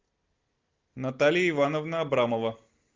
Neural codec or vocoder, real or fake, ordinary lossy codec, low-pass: none; real; Opus, 32 kbps; 7.2 kHz